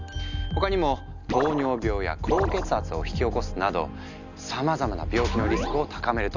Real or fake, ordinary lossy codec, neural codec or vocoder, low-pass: real; none; none; 7.2 kHz